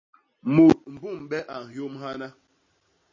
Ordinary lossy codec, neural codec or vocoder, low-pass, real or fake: MP3, 32 kbps; none; 7.2 kHz; real